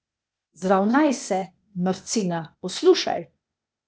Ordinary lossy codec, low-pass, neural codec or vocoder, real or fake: none; none; codec, 16 kHz, 0.8 kbps, ZipCodec; fake